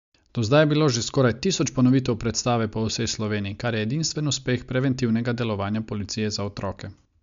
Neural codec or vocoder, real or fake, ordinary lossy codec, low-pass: none; real; MP3, 64 kbps; 7.2 kHz